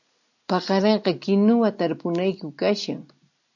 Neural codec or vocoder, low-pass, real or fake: none; 7.2 kHz; real